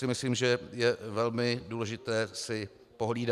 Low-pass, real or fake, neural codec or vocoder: 14.4 kHz; real; none